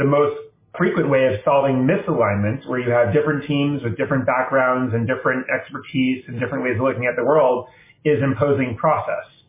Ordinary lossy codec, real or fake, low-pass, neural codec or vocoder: MP3, 16 kbps; real; 3.6 kHz; none